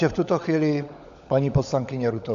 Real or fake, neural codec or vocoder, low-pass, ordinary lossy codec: fake; codec, 16 kHz, 16 kbps, FunCodec, trained on LibriTTS, 50 frames a second; 7.2 kHz; AAC, 64 kbps